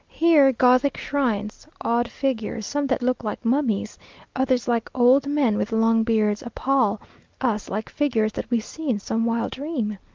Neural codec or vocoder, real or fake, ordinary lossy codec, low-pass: none; real; Opus, 32 kbps; 7.2 kHz